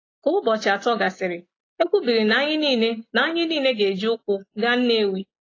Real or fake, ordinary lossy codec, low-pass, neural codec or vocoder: real; AAC, 32 kbps; 7.2 kHz; none